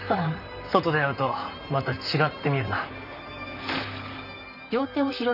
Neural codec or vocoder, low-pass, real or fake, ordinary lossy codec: vocoder, 44.1 kHz, 128 mel bands, Pupu-Vocoder; 5.4 kHz; fake; none